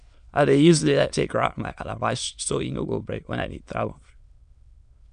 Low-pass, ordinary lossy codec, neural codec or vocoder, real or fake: 9.9 kHz; none; autoencoder, 22.05 kHz, a latent of 192 numbers a frame, VITS, trained on many speakers; fake